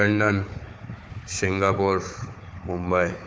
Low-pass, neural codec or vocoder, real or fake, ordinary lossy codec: none; codec, 16 kHz, 4 kbps, FunCodec, trained on Chinese and English, 50 frames a second; fake; none